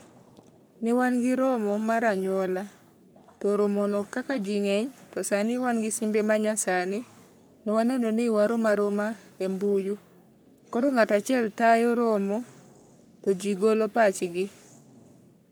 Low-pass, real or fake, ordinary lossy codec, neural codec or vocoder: none; fake; none; codec, 44.1 kHz, 3.4 kbps, Pupu-Codec